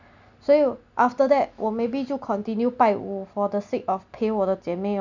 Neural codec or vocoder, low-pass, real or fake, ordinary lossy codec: none; 7.2 kHz; real; none